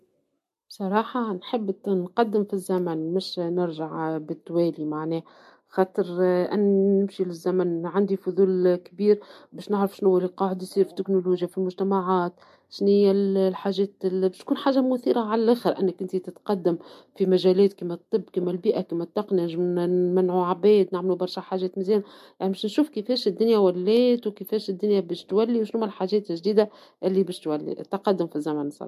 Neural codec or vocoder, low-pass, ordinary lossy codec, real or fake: none; 14.4 kHz; MP3, 64 kbps; real